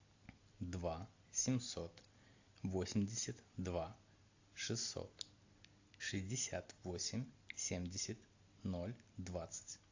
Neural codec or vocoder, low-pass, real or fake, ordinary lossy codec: none; 7.2 kHz; real; AAC, 48 kbps